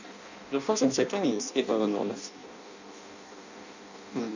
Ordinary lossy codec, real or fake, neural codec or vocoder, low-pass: none; fake; codec, 16 kHz in and 24 kHz out, 0.6 kbps, FireRedTTS-2 codec; 7.2 kHz